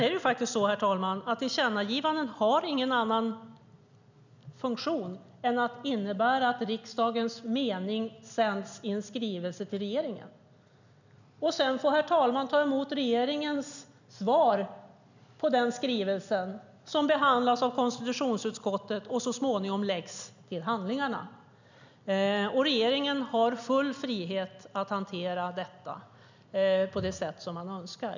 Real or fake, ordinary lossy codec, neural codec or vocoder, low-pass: real; none; none; 7.2 kHz